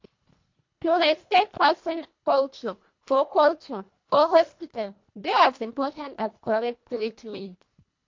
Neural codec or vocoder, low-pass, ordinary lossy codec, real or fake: codec, 24 kHz, 1.5 kbps, HILCodec; 7.2 kHz; MP3, 48 kbps; fake